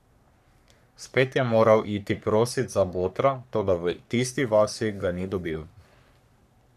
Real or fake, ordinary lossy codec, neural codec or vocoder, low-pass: fake; none; codec, 44.1 kHz, 3.4 kbps, Pupu-Codec; 14.4 kHz